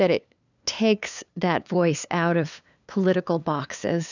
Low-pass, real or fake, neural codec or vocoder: 7.2 kHz; fake; codec, 16 kHz, 2 kbps, FunCodec, trained on LibriTTS, 25 frames a second